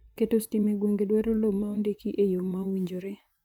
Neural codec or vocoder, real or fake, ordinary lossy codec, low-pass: vocoder, 44.1 kHz, 128 mel bands, Pupu-Vocoder; fake; none; 19.8 kHz